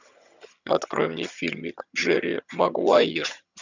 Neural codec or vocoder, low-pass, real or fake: vocoder, 22.05 kHz, 80 mel bands, HiFi-GAN; 7.2 kHz; fake